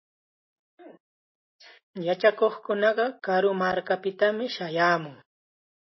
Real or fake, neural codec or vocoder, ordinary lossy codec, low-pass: real; none; MP3, 24 kbps; 7.2 kHz